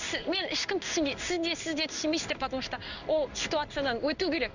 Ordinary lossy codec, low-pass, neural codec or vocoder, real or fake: none; 7.2 kHz; codec, 16 kHz in and 24 kHz out, 1 kbps, XY-Tokenizer; fake